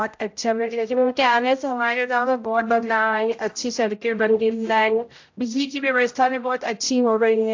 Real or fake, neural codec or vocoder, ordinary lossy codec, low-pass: fake; codec, 16 kHz, 0.5 kbps, X-Codec, HuBERT features, trained on general audio; AAC, 48 kbps; 7.2 kHz